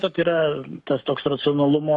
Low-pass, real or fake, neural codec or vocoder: 10.8 kHz; fake; codec, 44.1 kHz, 7.8 kbps, Pupu-Codec